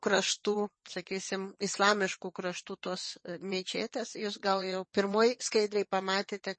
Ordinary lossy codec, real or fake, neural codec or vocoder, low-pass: MP3, 32 kbps; fake; vocoder, 22.05 kHz, 80 mel bands, WaveNeXt; 9.9 kHz